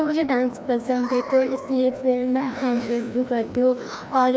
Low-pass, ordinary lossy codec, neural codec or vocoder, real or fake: none; none; codec, 16 kHz, 1 kbps, FreqCodec, larger model; fake